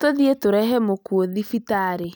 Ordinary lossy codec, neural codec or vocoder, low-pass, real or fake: none; none; none; real